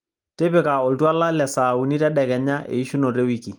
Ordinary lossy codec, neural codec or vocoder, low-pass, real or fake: Opus, 32 kbps; none; 19.8 kHz; real